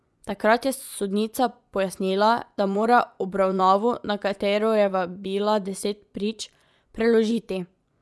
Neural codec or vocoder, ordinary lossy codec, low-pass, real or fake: vocoder, 24 kHz, 100 mel bands, Vocos; none; none; fake